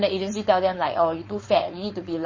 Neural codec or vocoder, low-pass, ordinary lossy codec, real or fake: codec, 16 kHz, 4.8 kbps, FACodec; 7.2 kHz; MP3, 32 kbps; fake